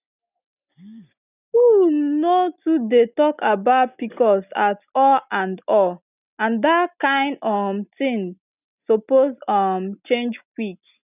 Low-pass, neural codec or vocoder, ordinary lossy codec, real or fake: 3.6 kHz; none; none; real